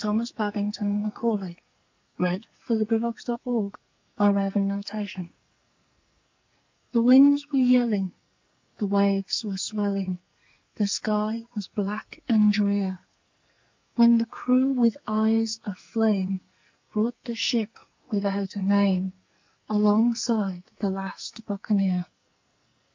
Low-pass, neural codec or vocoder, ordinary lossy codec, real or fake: 7.2 kHz; codec, 44.1 kHz, 2.6 kbps, SNAC; MP3, 64 kbps; fake